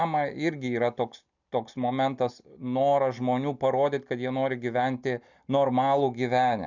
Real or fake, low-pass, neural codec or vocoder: real; 7.2 kHz; none